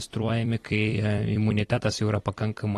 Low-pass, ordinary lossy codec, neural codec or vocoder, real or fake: 19.8 kHz; AAC, 32 kbps; vocoder, 44.1 kHz, 128 mel bands every 256 samples, BigVGAN v2; fake